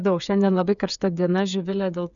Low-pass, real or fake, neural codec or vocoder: 7.2 kHz; fake; codec, 16 kHz, 8 kbps, FreqCodec, smaller model